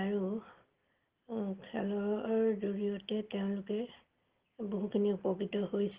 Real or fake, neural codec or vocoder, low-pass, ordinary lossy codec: real; none; 3.6 kHz; Opus, 16 kbps